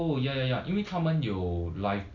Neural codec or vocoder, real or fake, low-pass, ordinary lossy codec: none; real; 7.2 kHz; none